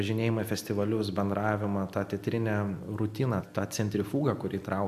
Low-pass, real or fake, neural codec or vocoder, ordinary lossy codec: 14.4 kHz; real; none; AAC, 96 kbps